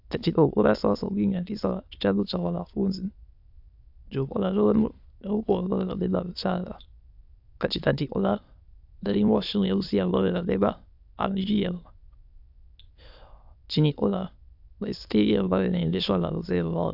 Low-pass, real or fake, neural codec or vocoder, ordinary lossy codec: 5.4 kHz; fake; autoencoder, 22.05 kHz, a latent of 192 numbers a frame, VITS, trained on many speakers; AAC, 48 kbps